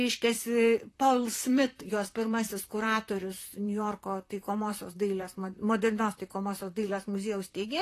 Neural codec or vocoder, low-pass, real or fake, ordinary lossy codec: vocoder, 44.1 kHz, 128 mel bands, Pupu-Vocoder; 14.4 kHz; fake; AAC, 48 kbps